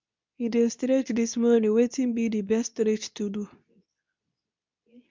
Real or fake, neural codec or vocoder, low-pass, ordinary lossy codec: fake; codec, 24 kHz, 0.9 kbps, WavTokenizer, medium speech release version 2; 7.2 kHz; MP3, 64 kbps